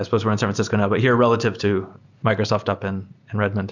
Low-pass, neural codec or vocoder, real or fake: 7.2 kHz; none; real